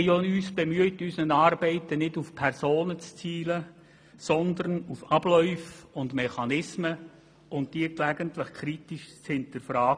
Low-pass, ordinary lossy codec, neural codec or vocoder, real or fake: none; none; none; real